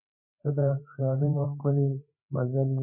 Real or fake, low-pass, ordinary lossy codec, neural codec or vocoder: fake; 3.6 kHz; MP3, 24 kbps; codec, 16 kHz, 4 kbps, FreqCodec, larger model